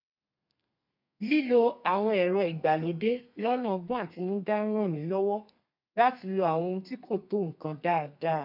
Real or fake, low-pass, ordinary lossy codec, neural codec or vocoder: fake; 5.4 kHz; none; codec, 32 kHz, 1.9 kbps, SNAC